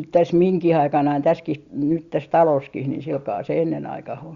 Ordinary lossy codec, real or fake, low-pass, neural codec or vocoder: Opus, 64 kbps; real; 7.2 kHz; none